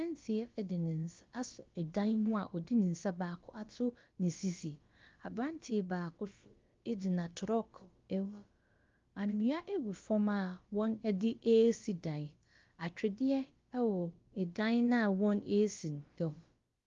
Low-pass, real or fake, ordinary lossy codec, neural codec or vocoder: 7.2 kHz; fake; Opus, 32 kbps; codec, 16 kHz, about 1 kbps, DyCAST, with the encoder's durations